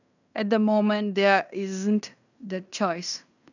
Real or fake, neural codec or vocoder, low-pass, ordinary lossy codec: fake; codec, 16 kHz in and 24 kHz out, 0.9 kbps, LongCat-Audio-Codec, fine tuned four codebook decoder; 7.2 kHz; none